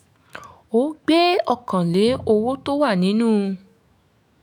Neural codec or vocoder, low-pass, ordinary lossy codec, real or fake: autoencoder, 48 kHz, 128 numbers a frame, DAC-VAE, trained on Japanese speech; none; none; fake